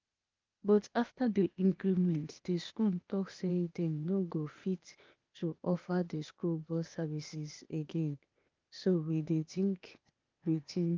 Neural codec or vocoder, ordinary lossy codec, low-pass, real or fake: codec, 16 kHz, 0.8 kbps, ZipCodec; Opus, 24 kbps; 7.2 kHz; fake